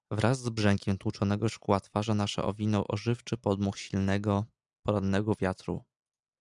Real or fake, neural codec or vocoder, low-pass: real; none; 10.8 kHz